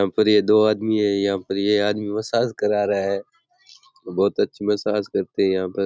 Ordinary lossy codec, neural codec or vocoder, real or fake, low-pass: none; none; real; none